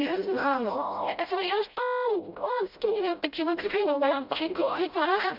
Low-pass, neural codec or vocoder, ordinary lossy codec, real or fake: 5.4 kHz; codec, 16 kHz, 0.5 kbps, FreqCodec, smaller model; none; fake